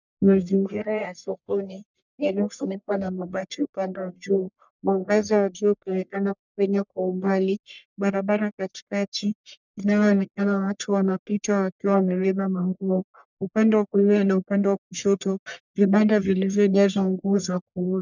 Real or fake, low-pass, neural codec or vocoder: fake; 7.2 kHz; codec, 44.1 kHz, 1.7 kbps, Pupu-Codec